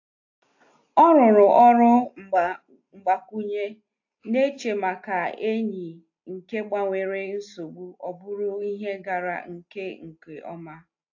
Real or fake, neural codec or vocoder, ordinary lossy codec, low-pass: real; none; AAC, 48 kbps; 7.2 kHz